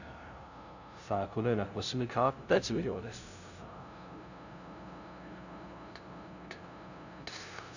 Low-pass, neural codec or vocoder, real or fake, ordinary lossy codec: 7.2 kHz; codec, 16 kHz, 0.5 kbps, FunCodec, trained on LibriTTS, 25 frames a second; fake; none